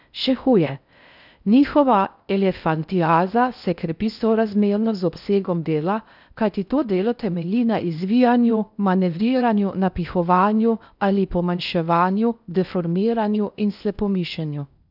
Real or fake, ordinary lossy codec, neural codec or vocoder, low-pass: fake; none; codec, 16 kHz in and 24 kHz out, 0.6 kbps, FocalCodec, streaming, 4096 codes; 5.4 kHz